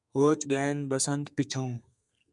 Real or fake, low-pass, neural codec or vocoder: fake; 10.8 kHz; codec, 32 kHz, 1.9 kbps, SNAC